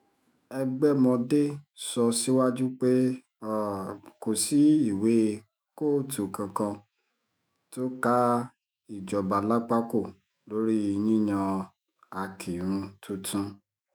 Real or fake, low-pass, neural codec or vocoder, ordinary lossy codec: fake; none; autoencoder, 48 kHz, 128 numbers a frame, DAC-VAE, trained on Japanese speech; none